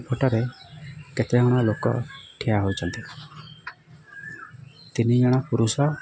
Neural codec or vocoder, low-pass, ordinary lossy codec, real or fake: none; none; none; real